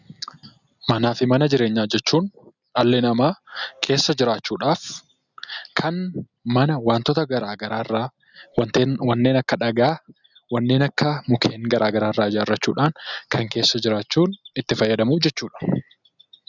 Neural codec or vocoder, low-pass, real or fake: none; 7.2 kHz; real